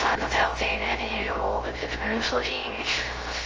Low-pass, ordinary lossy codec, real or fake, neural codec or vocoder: 7.2 kHz; Opus, 24 kbps; fake; codec, 16 kHz, 0.3 kbps, FocalCodec